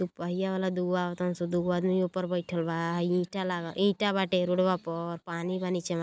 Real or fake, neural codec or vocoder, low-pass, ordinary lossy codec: real; none; none; none